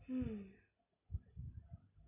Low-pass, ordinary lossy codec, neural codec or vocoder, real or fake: 3.6 kHz; AAC, 16 kbps; none; real